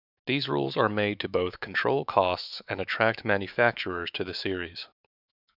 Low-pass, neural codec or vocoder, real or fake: 5.4 kHz; autoencoder, 48 kHz, 128 numbers a frame, DAC-VAE, trained on Japanese speech; fake